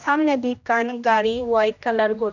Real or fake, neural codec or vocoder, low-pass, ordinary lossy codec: fake; codec, 16 kHz, 1 kbps, X-Codec, HuBERT features, trained on general audio; 7.2 kHz; none